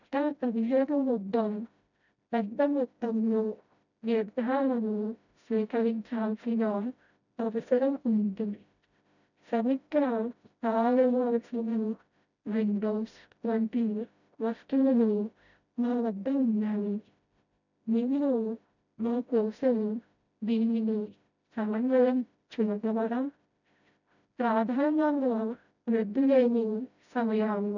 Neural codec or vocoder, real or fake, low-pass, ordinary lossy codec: codec, 16 kHz, 0.5 kbps, FreqCodec, smaller model; fake; 7.2 kHz; none